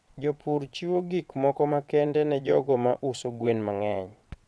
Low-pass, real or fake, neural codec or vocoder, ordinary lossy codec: none; fake; vocoder, 22.05 kHz, 80 mel bands, WaveNeXt; none